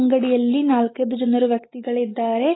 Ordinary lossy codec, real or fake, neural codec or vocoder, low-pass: AAC, 16 kbps; real; none; 7.2 kHz